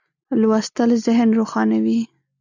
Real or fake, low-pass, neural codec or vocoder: real; 7.2 kHz; none